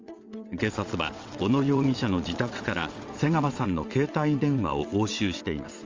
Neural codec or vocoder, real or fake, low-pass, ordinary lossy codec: vocoder, 22.05 kHz, 80 mel bands, WaveNeXt; fake; 7.2 kHz; Opus, 32 kbps